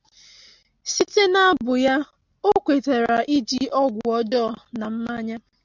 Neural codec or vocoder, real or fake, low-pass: none; real; 7.2 kHz